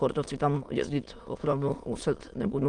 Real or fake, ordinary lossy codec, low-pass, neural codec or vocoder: fake; Opus, 24 kbps; 9.9 kHz; autoencoder, 22.05 kHz, a latent of 192 numbers a frame, VITS, trained on many speakers